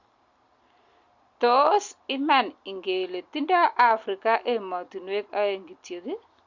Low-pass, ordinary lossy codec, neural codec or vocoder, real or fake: 7.2 kHz; Opus, 64 kbps; none; real